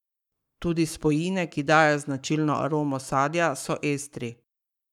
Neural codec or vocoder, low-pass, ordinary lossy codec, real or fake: codec, 44.1 kHz, 7.8 kbps, Pupu-Codec; 19.8 kHz; none; fake